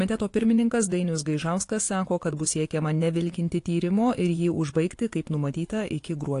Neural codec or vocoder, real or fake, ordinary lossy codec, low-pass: vocoder, 24 kHz, 100 mel bands, Vocos; fake; AAC, 48 kbps; 10.8 kHz